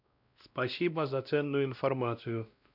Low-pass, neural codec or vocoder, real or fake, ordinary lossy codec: 5.4 kHz; codec, 16 kHz, 1 kbps, X-Codec, WavLM features, trained on Multilingual LibriSpeech; fake; none